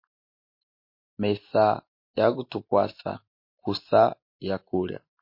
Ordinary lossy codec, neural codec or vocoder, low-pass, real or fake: MP3, 32 kbps; none; 5.4 kHz; real